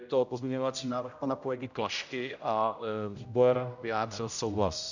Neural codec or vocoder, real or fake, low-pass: codec, 16 kHz, 0.5 kbps, X-Codec, HuBERT features, trained on general audio; fake; 7.2 kHz